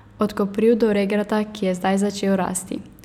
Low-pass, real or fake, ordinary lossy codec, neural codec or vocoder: 19.8 kHz; real; none; none